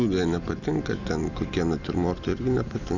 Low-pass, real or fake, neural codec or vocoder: 7.2 kHz; real; none